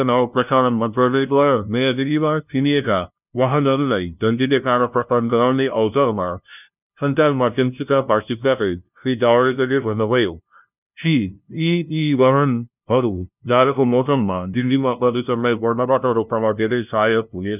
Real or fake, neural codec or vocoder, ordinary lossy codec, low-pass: fake; codec, 16 kHz, 0.5 kbps, FunCodec, trained on LibriTTS, 25 frames a second; none; 3.6 kHz